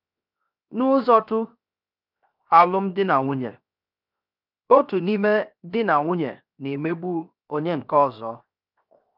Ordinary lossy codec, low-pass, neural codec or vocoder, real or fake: none; 5.4 kHz; codec, 16 kHz, 0.7 kbps, FocalCodec; fake